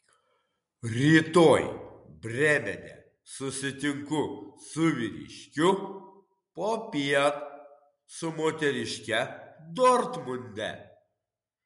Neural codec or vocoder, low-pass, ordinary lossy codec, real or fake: none; 10.8 kHz; MP3, 64 kbps; real